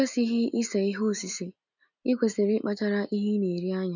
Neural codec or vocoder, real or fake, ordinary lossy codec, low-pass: none; real; none; 7.2 kHz